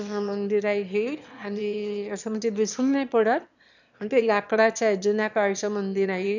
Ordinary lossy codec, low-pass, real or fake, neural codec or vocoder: none; 7.2 kHz; fake; autoencoder, 22.05 kHz, a latent of 192 numbers a frame, VITS, trained on one speaker